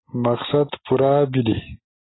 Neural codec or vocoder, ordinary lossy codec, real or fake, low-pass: none; AAC, 16 kbps; real; 7.2 kHz